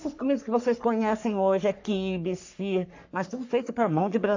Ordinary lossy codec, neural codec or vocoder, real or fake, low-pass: AAC, 48 kbps; codec, 44.1 kHz, 3.4 kbps, Pupu-Codec; fake; 7.2 kHz